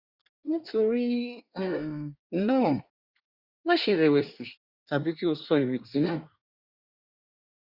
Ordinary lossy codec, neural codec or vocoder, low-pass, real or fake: Opus, 64 kbps; codec, 24 kHz, 1 kbps, SNAC; 5.4 kHz; fake